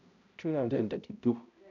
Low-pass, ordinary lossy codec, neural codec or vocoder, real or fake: 7.2 kHz; none; codec, 16 kHz, 0.5 kbps, X-Codec, HuBERT features, trained on balanced general audio; fake